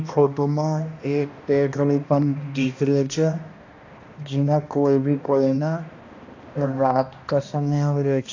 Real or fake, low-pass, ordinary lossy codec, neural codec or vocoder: fake; 7.2 kHz; AAC, 48 kbps; codec, 16 kHz, 1 kbps, X-Codec, HuBERT features, trained on balanced general audio